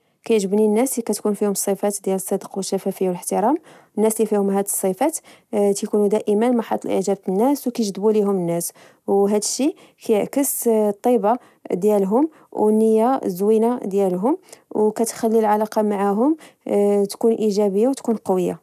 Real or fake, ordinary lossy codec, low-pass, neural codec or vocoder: real; none; 14.4 kHz; none